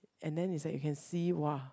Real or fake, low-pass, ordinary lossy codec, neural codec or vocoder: real; none; none; none